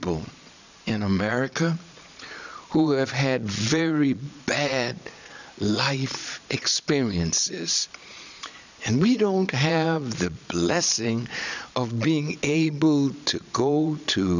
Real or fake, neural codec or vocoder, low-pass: fake; vocoder, 22.05 kHz, 80 mel bands, Vocos; 7.2 kHz